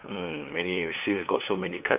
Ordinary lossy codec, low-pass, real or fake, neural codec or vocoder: none; 3.6 kHz; fake; codec, 16 kHz, 2 kbps, FunCodec, trained on LibriTTS, 25 frames a second